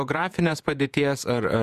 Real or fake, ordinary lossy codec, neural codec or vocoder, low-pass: fake; Opus, 64 kbps; vocoder, 48 kHz, 128 mel bands, Vocos; 14.4 kHz